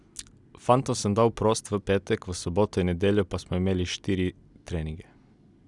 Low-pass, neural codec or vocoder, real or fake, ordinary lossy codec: 10.8 kHz; none; real; none